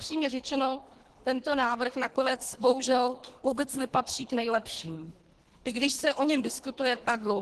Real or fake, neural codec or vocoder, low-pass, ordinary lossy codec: fake; codec, 24 kHz, 1.5 kbps, HILCodec; 10.8 kHz; Opus, 16 kbps